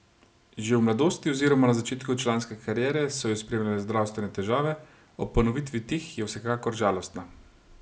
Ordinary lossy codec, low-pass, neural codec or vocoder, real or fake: none; none; none; real